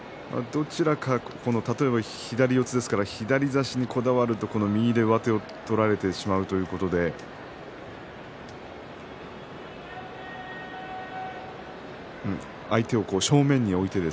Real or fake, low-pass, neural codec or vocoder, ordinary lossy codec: real; none; none; none